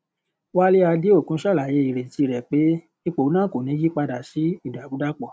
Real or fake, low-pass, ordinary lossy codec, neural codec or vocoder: real; none; none; none